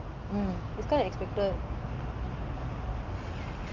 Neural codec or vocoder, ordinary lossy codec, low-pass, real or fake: none; Opus, 24 kbps; 7.2 kHz; real